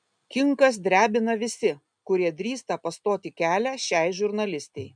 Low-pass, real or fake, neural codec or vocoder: 9.9 kHz; real; none